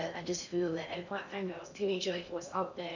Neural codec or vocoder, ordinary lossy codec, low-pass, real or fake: codec, 16 kHz in and 24 kHz out, 0.8 kbps, FocalCodec, streaming, 65536 codes; none; 7.2 kHz; fake